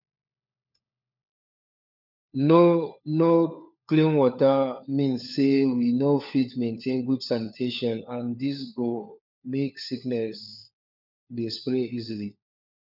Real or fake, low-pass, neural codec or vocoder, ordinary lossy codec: fake; 5.4 kHz; codec, 16 kHz, 4 kbps, FunCodec, trained on LibriTTS, 50 frames a second; none